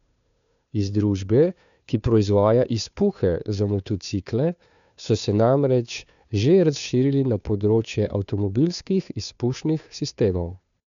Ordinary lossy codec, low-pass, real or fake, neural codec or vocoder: none; 7.2 kHz; fake; codec, 16 kHz, 2 kbps, FunCodec, trained on Chinese and English, 25 frames a second